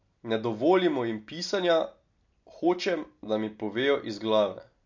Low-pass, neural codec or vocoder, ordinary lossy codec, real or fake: 7.2 kHz; none; MP3, 48 kbps; real